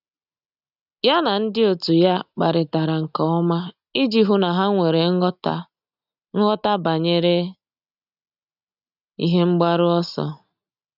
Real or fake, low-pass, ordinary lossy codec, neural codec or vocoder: real; 5.4 kHz; none; none